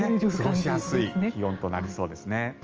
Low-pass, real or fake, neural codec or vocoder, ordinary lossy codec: 7.2 kHz; real; none; Opus, 24 kbps